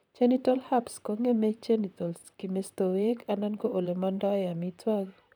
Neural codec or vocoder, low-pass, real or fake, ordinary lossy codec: vocoder, 44.1 kHz, 128 mel bands, Pupu-Vocoder; none; fake; none